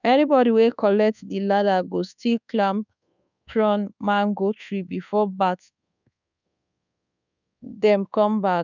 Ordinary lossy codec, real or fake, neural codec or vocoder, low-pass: none; fake; codec, 24 kHz, 1.2 kbps, DualCodec; 7.2 kHz